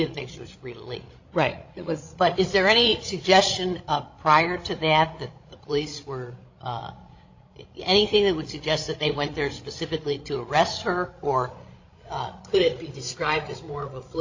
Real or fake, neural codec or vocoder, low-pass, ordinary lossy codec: fake; codec, 16 kHz, 16 kbps, FreqCodec, larger model; 7.2 kHz; AAC, 48 kbps